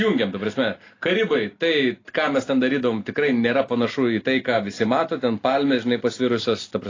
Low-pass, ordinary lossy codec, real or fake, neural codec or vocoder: 7.2 kHz; AAC, 32 kbps; real; none